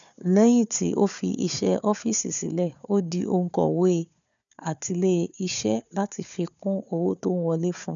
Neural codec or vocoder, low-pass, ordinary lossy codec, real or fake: codec, 16 kHz, 4 kbps, FunCodec, trained on Chinese and English, 50 frames a second; 7.2 kHz; none; fake